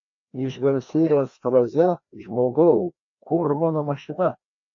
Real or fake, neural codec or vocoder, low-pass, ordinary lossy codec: fake; codec, 16 kHz, 1 kbps, FreqCodec, larger model; 7.2 kHz; AAC, 64 kbps